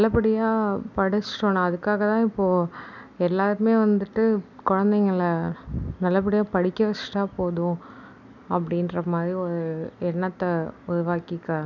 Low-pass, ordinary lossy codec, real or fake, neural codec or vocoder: 7.2 kHz; none; real; none